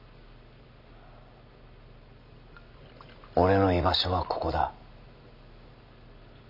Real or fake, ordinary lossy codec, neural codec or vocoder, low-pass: real; none; none; 5.4 kHz